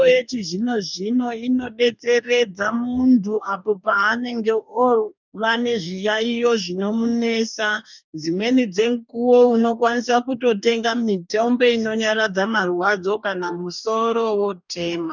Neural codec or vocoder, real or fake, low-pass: codec, 44.1 kHz, 2.6 kbps, DAC; fake; 7.2 kHz